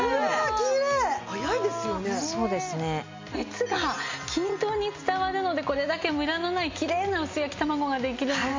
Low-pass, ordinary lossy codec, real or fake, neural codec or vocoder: 7.2 kHz; MP3, 64 kbps; real; none